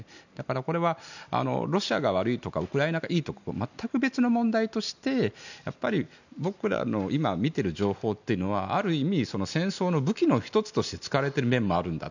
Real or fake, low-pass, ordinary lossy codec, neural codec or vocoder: real; 7.2 kHz; none; none